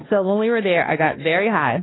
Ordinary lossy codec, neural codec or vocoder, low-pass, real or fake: AAC, 16 kbps; codec, 16 kHz, 2 kbps, X-Codec, HuBERT features, trained on balanced general audio; 7.2 kHz; fake